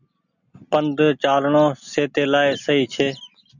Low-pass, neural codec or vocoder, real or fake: 7.2 kHz; none; real